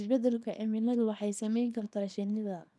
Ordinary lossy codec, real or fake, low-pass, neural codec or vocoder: none; fake; none; codec, 24 kHz, 0.9 kbps, WavTokenizer, small release